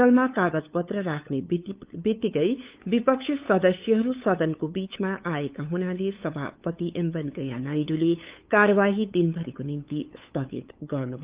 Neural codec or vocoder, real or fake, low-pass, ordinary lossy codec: codec, 16 kHz, 8 kbps, FunCodec, trained on LibriTTS, 25 frames a second; fake; 3.6 kHz; Opus, 32 kbps